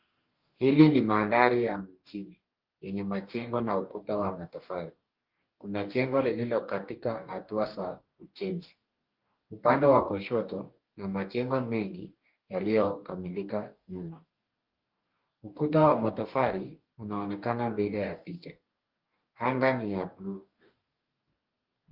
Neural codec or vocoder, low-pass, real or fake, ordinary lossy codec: codec, 44.1 kHz, 2.6 kbps, DAC; 5.4 kHz; fake; Opus, 32 kbps